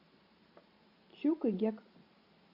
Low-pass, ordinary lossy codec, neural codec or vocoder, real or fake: 5.4 kHz; AAC, 48 kbps; vocoder, 44.1 kHz, 128 mel bands every 512 samples, BigVGAN v2; fake